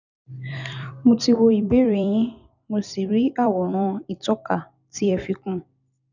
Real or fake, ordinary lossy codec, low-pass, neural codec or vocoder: fake; none; 7.2 kHz; vocoder, 44.1 kHz, 128 mel bands every 256 samples, BigVGAN v2